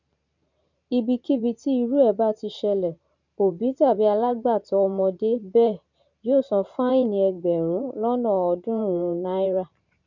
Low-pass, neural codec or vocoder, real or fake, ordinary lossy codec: 7.2 kHz; vocoder, 44.1 kHz, 128 mel bands every 512 samples, BigVGAN v2; fake; none